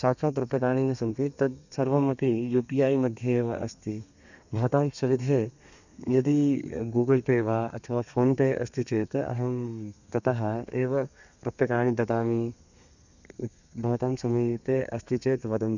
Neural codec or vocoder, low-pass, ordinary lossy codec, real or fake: codec, 32 kHz, 1.9 kbps, SNAC; 7.2 kHz; none; fake